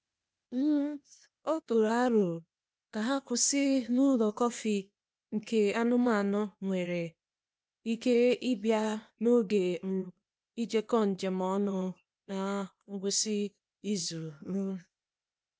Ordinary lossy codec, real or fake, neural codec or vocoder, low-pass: none; fake; codec, 16 kHz, 0.8 kbps, ZipCodec; none